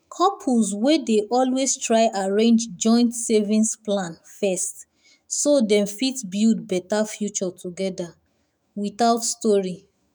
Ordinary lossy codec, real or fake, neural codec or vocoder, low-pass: none; fake; autoencoder, 48 kHz, 128 numbers a frame, DAC-VAE, trained on Japanese speech; none